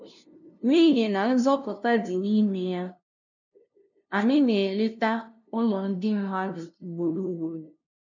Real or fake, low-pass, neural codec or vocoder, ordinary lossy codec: fake; 7.2 kHz; codec, 16 kHz, 0.5 kbps, FunCodec, trained on LibriTTS, 25 frames a second; none